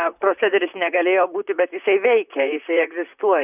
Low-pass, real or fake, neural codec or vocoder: 3.6 kHz; fake; vocoder, 44.1 kHz, 128 mel bands, Pupu-Vocoder